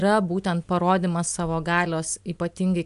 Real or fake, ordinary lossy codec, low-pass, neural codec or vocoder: real; AAC, 96 kbps; 10.8 kHz; none